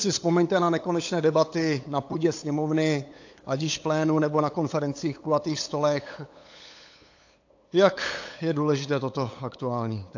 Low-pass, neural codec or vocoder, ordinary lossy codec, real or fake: 7.2 kHz; codec, 16 kHz, 8 kbps, FunCodec, trained on LibriTTS, 25 frames a second; AAC, 48 kbps; fake